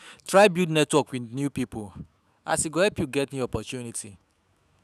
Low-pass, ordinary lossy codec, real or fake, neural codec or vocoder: 14.4 kHz; none; fake; autoencoder, 48 kHz, 128 numbers a frame, DAC-VAE, trained on Japanese speech